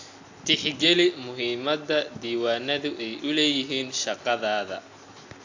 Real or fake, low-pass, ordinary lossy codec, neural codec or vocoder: real; 7.2 kHz; AAC, 48 kbps; none